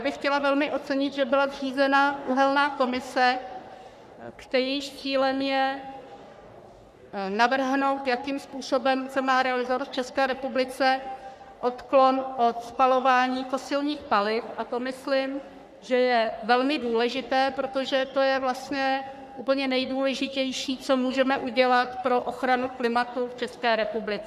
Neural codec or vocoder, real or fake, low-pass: codec, 44.1 kHz, 3.4 kbps, Pupu-Codec; fake; 14.4 kHz